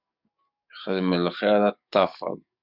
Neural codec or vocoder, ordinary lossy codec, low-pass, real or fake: codec, 44.1 kHz, 7.8 kbps, DAC; Opus, 64 kbps; 5.4 kHz; fake